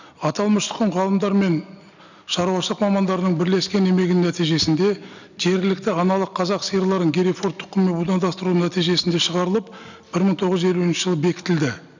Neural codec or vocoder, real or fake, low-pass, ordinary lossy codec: none; real; 7.2 kHz; none